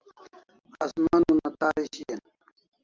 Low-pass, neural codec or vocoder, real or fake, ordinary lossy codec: 7.2 kHz; none; real; Opus, 32 kbps